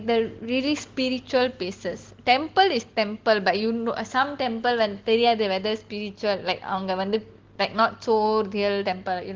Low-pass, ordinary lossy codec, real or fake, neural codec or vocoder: 7.2 kHz; Opus, 16 kbps; real; none